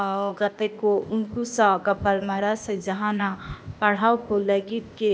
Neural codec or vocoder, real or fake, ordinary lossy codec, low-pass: codec, 16 kHz, 0.8 kbps, ZipCodec; fake; none; none